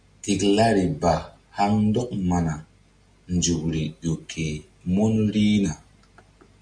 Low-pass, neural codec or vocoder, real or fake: 9.9 kHz; none; real